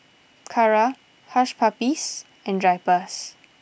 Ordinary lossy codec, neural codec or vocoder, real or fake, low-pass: none; none; real; none